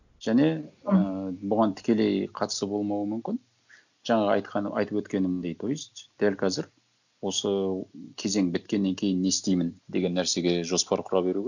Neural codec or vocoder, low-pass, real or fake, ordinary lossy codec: none; 7.2 kHz; real; none